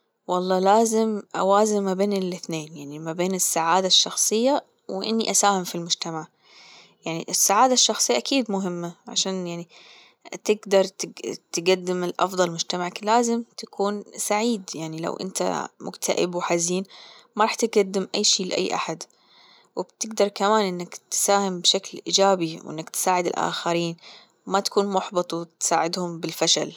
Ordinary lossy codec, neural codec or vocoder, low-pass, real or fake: none; none; none; real